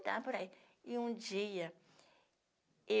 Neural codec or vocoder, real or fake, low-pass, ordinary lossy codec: none; real; none; none